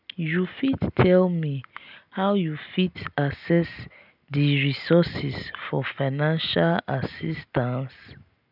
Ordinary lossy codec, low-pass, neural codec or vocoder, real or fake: none; 5.4 kHz; none; real